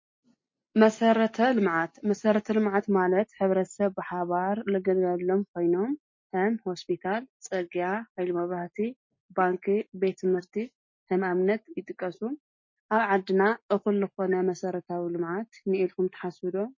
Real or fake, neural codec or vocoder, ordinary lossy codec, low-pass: real; none; MP3, 32 kbps; 7.2 kHz